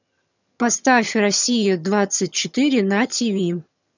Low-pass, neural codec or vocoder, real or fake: 7.2 kHz; vocoder, 22.05 kHz, 80 mel bands, HiFi-GAN; fake